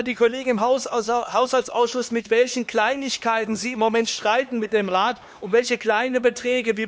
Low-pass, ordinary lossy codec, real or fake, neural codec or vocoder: none; none; fake; codec, 16 kHz, 2 kbps, X-Codec, HuBERT features, trained on LibriSpeech